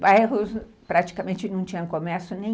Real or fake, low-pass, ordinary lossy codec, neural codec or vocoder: real; none; none; none